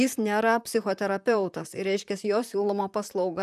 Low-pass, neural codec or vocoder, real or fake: 14.4 kHz; vocoder, 44.1 kHz, 128 mel bands, Pupu-Vocoder; fake